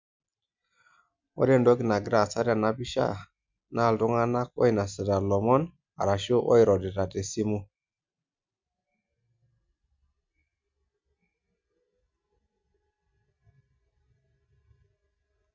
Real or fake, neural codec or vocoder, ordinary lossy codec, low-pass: real; none; MP3, 64 kbps; 7.2 kHz